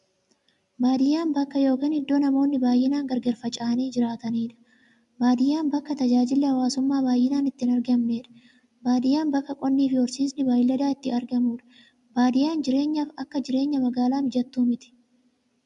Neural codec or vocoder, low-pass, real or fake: none; 10.8 kHz; real